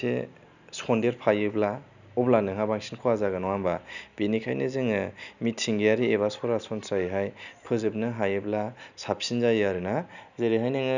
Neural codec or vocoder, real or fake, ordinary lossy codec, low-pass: none; real; none; 7.2 kHz